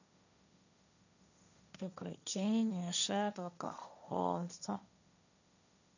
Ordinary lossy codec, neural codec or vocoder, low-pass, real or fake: none; codec, 16 kHz, 1.1 kbps, Voila-Tokenizer; 7.2 kHz; fake